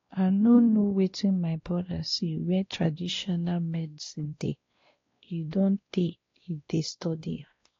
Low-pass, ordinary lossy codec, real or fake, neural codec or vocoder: 7.2 kHz; AAC, 32 kbps; fake; codec, 16 kHz, 1 kbps, X-Codec, WavLM features, trained on Multilingual LibriSpeech